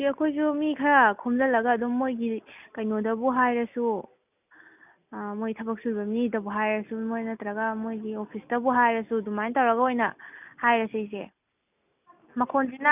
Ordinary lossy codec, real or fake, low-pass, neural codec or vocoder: none; real; 3.6 kHz; none